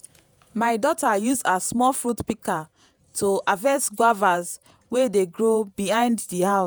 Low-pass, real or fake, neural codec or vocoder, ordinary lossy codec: none; fake; vocoder, 48 kHz, 128 mel bands, Vocos; none